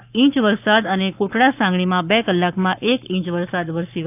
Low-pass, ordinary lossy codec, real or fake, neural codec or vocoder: 3.6 kHz; none; fake; codec, 44.1 kHz, 7.8 kbps, Pupu-Codec